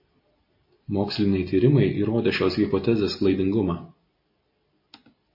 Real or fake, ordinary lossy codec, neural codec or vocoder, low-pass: real; MP3, 24 kbps; none; 5.4 kHz